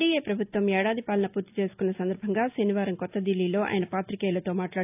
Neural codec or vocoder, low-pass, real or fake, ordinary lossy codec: none; 3.6 kHz; real; AAC, 32 kbps